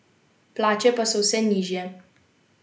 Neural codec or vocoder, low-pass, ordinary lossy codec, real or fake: none; none; none; real